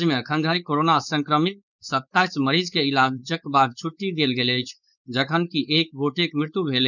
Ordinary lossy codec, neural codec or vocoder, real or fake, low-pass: none; codec, 16 kHz, 4.8 kbps, FACodec; fake; 7.2 kHz